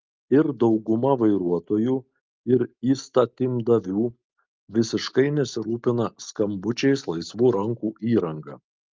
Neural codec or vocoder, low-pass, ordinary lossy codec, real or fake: none; 7.2 kHz; Opus, 24 kbps; real